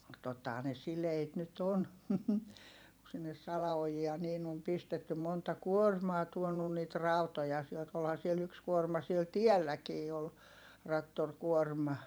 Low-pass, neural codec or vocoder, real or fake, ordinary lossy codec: none; vocoder, 44.1 kHz, 128 mel bands every 512 samples, BigVGAN v2; fake; none